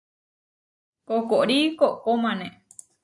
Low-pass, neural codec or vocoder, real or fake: 10.8 kHz; none; real